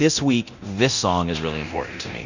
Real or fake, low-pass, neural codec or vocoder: fake; 7.2 kHz; codec, 24 kHz, 0.9 kbps, DualCodec